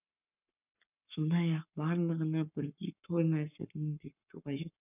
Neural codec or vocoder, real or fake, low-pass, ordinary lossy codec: codec, 44.1 kHz, 7.8 kbps, Pupu-Codec; fake; 3.6 kHz; none